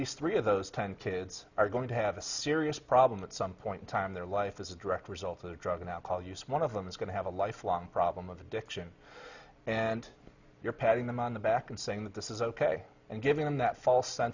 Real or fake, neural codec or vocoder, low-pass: real; none; 7.2 kHz